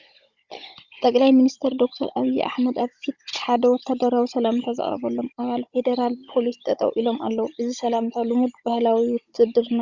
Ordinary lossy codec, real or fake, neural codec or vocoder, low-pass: Opus, 64 kbps; fake; codec, 16 kHz, 16 kbps, FunCodec, trained on Chinese and English, 50 frames a second; 7.2 kHz